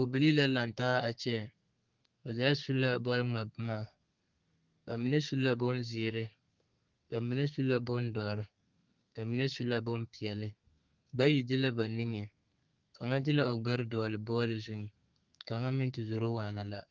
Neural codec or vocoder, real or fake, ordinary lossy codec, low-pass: codec, 32 kHz, 1.9 kbps, SNAC; fake; Opus, 24 kbps; 7.2 kHz